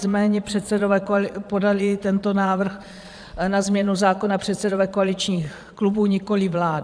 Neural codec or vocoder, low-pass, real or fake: vocoder, 22.05 kHz, 80 mel bands, Vocos; 9.9 kHz; fake